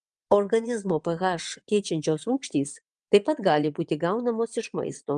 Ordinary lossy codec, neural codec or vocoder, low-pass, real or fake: Opus, 32 kbps; vocoder, 22.05 kHz, 80 mel bands, WaveNeXt; 9.9 kHz; fake